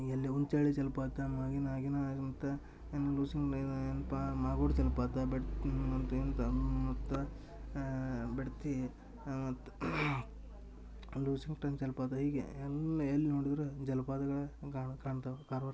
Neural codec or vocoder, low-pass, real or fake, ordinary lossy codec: none; none; real; none